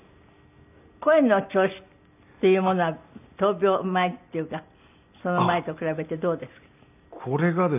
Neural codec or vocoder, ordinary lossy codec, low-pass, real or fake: none; none; 3.6 kHz; real